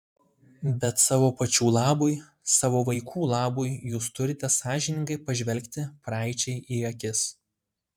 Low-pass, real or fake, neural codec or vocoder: 19.8 kHz; fake; vocoder, 44.1 kHz, 128 mel bands every 512 samples, BigVGAN v2